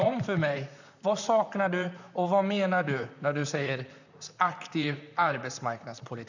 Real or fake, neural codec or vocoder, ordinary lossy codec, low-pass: fake; vocoder, 44.1 kHz, 128 mel bands, Pupu-Vocoder; none; 7.2 kHz